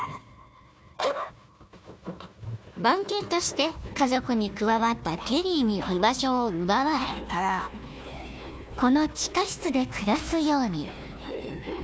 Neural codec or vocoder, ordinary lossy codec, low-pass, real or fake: codec, 16 kHz, 1 kbps, FunCodec, trained on Chinese and English, 50 frames a second; none; none; fake